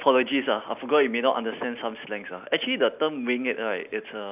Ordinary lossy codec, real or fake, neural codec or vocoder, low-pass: none; real; none; 3.6 kHz